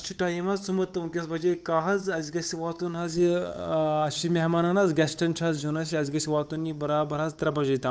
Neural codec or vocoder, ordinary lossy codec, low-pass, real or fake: codec, 16 kHz, 8 kbps, FunCodec, trained on Chinese and English, 25 frames a second; none; none; fake